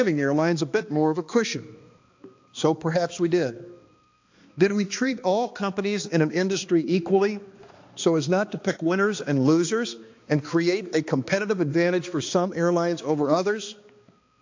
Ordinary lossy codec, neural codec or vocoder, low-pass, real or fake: AAC, 48 kbps; codec, 16 kHz, 2 kbps, X-Codec, HuBERT features, trained on balanced general audio; 7.2 kHz; fake